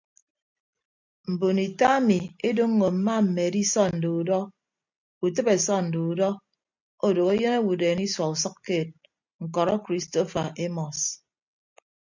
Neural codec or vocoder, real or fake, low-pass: none; real; 7.2 kHz